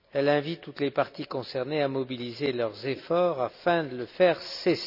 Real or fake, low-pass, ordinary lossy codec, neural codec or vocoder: real; 5.4 kHz; none; none